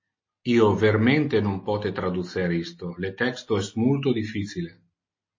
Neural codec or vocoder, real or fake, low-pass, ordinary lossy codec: none; real; 7.2 kHz; MP3, 32 kbps